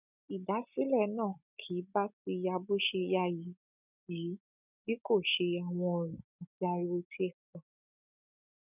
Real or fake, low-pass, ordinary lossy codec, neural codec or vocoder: real; 3.6 kHz; none; none